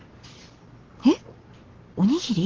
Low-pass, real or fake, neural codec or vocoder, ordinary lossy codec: 7.2 kHz; real; none; Opus, 16 kbps